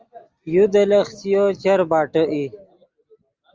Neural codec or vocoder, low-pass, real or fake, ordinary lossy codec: none; 7.2 kHz; real; Opus, 32 kbps